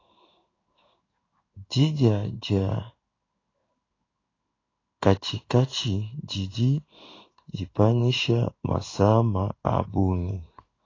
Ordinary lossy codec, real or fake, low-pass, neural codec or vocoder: AAC, 32 kbps; fake; 7.2 kHz; codec, 16 kHz in and 24 kHz out, 1 kbps, XY-Tokenizer